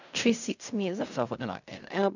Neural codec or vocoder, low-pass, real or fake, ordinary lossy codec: codec, 16 kHz in and 24 kHz out, 0.4 kbps, LongCat-Audio-Codec, fine tuned four codebook decoder; 7.2 kHz; fake; none